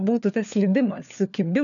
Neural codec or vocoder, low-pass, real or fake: codec, 16 kHz, 4 kbps, FunCodec, trained on LibriTTS, 50 frames a second; 7.2 kHz; fake